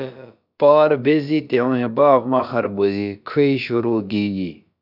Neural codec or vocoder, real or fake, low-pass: codec, 16 kHz, about 1 kbps, DyCAST, with the encoder's durations; fake; 5.4 kHz